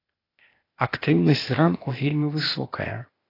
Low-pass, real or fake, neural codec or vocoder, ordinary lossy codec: 5.4 kHz; fake; codec, 16 kHz, 0.8 kbps, ZipCodec; AAC, 24 kbps